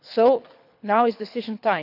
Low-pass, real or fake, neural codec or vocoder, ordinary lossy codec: 5.4 kHz; fake; codec, 24 kHz, 6 kbps, HILCodec; none